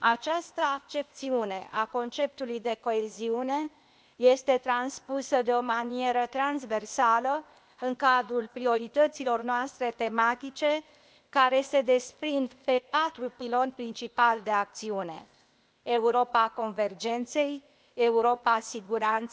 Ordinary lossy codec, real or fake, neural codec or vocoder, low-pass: none; fake; codec, 16 kHz, 0.8 kbps, ZipCodec; none